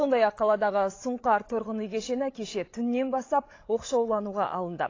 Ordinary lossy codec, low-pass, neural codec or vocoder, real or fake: AAC, 32 kbps; 7.2 kHz; codec, 16 kHz, 16 kbps, FreqCodec, larger model; fake